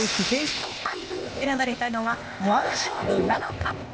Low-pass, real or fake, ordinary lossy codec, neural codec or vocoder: none; fake; none; codec, 16 kHz, 0.8 kbps, ZipCodec